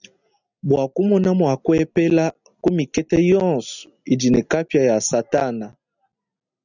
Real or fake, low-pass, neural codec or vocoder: real; 7.2 kHz; none